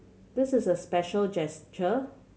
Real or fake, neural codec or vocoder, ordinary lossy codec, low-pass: real; none; none; none